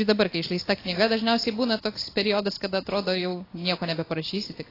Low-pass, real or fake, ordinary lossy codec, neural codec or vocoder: 5.4 kHz; real; AAC, 24 kbps; none